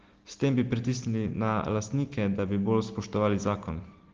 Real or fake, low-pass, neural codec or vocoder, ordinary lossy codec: real; 7.2 kHz; none; Opus, 16 kbps